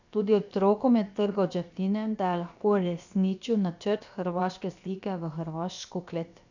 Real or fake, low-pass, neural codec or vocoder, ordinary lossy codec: fake; 7.2 kHz; codec, 16 kHz, about 1 kbps, DyCAST, with the encoder's durations; none